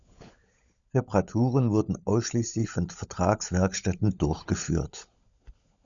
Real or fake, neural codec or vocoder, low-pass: fake; codec, 16 kHz, 16 kbps, FunCodec, trained on LibriTTS, 50 frames a second; 7.2 kHz